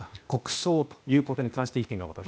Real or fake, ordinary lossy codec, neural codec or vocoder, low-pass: fake; none; codec, 16 kHz, 0.8 kbps, ZipCodec; none